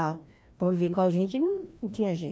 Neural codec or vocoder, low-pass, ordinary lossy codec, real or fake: codec, 16 kHz, 1 kbps, FreqCodec, larger model; none; none; fake